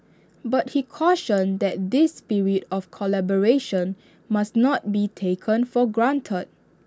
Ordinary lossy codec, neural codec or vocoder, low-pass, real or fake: none; none; none; real